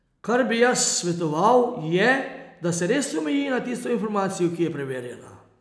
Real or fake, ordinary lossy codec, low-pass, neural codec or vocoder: real; none; none; none